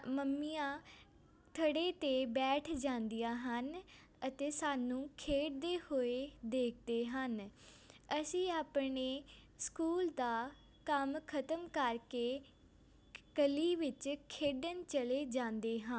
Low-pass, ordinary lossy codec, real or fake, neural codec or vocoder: none; none; real; none